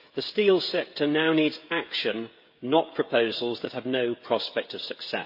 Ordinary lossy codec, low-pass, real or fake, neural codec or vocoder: MP3, 32 kbps; 5.4 kHz; fake; codec, 16 kHz, 16 kbps, FreqCodec, smaller model